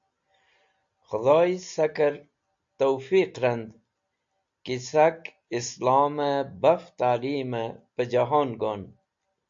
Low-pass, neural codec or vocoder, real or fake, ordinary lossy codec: 7.2 kHz; none; real; AAC, 64 kbps